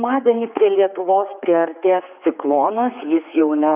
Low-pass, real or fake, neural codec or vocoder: 3.6 kHz; fake; codec, 16 kHz, 4 kbps, X-Codec, HuBERT features, trained on general audio